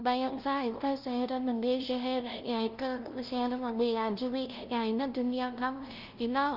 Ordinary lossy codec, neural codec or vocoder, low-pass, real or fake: Opus, 24 kbps; codec, 16 kHz, 0.5 kbps, FunCodec, trained on LibriTTS, 25 frames a second; 5.4 kHz; fake